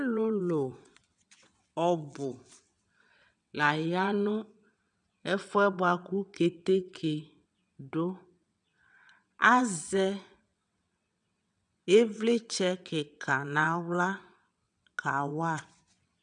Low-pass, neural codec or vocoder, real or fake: 9.9 kHz; vocoder, 22.05 kHz, 80 mel bands, WaveNeXt; fake